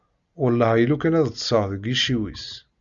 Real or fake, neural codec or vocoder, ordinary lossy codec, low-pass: real; none; Opus, 64 kbps; 7.2 kHz